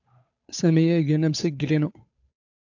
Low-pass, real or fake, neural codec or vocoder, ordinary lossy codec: 7.2 kHz; fake; codec, 16 kHz, 8 kbps, FunCodec, trained on Chinese and English, 25 frames a second; AAC, 48 kbps